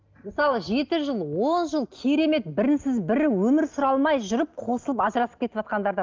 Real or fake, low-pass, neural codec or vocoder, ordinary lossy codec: real; 7.2 kHz; none; Opus, 32 kbps